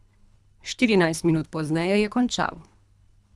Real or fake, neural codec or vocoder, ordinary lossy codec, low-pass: fake; codec, 24 kHz, 3 kbps, HILCodec; none; none